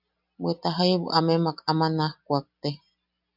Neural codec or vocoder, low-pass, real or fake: none; 5.4 kHz; real